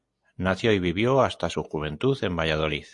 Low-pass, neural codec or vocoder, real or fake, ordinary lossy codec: 10.8 kHz; none; real; MP3, 48 kbps